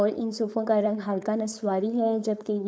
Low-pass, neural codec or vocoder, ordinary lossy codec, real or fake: none; codec, 16 kHz, 4.8 kbps, FACodec; none; fake